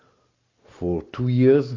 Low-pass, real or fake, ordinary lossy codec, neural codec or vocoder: 7.2 kHz; real; AAC, 32 kbps; none